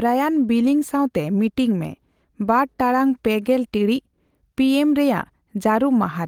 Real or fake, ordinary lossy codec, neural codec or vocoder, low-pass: real; Opus, 24 kbps; none; 19.8 kHz